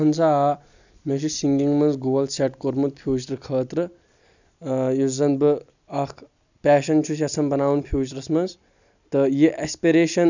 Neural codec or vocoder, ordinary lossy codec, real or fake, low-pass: none; none; real; 7.2 kHz